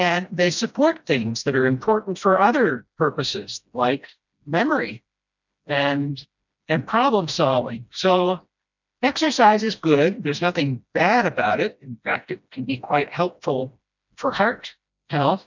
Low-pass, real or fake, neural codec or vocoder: 7.2 kHz; fake; codec, 16 kHz, 1 kbps, FreqCodec, smaller model